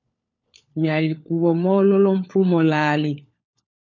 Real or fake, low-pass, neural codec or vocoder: fake; 7.2 kHz; codec, 16 kHz, 4 kbps, FunCodec, trained on LibriTTS, 50 frames a second